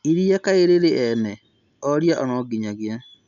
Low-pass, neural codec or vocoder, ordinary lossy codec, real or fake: 7.2 kHz; none; MP3, 96 kbps; real